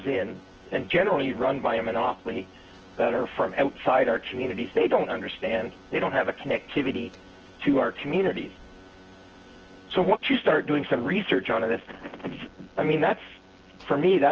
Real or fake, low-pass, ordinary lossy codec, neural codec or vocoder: fake; 7.2 kHz; Opus, 16 kbps; vocoder, 24 kHz, 100 mel bands, Vocos